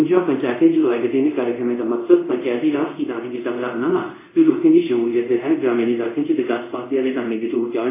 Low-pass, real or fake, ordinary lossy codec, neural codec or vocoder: 3.6 kHz; fake; AAC, 24 kbps; codec, 24 kHz, 0.5 kbps, DualCodec